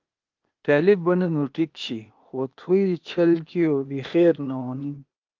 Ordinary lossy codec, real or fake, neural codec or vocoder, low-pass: Opus, 32 kbps; fake; codec, 16 kHz, 0.8 kbps, ZipCodec; 7.2 kHz